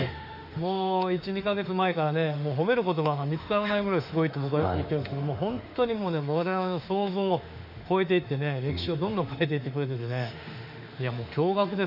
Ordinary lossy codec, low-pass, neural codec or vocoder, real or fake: none; 5.4 kHz; autoencoder, 48 kHz, 32 numbers a frame, DAC-VAE, trained on Japanese speech; fake